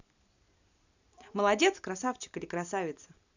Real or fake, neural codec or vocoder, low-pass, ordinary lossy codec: real; none; 7.2 kHz; none